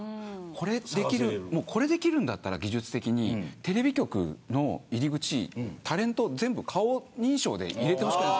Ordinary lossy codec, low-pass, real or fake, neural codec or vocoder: none; none; real; none